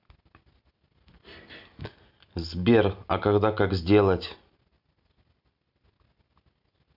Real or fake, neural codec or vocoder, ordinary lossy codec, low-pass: real; none; none; 5.4 kHz